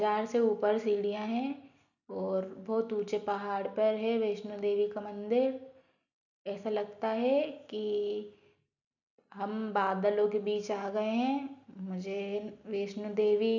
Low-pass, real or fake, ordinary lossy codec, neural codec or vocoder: 7.2 kHz; real; none; none